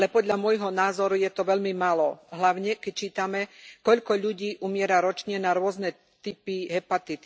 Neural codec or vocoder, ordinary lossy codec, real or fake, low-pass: none; none; real; none